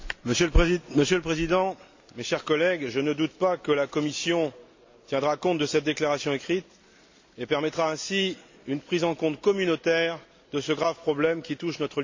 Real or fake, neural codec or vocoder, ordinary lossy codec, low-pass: real; none; MP3, 48 kbps; 7.2 kHz